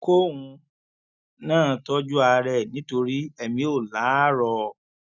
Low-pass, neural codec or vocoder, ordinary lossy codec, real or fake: 7.2 kHz; none; none; real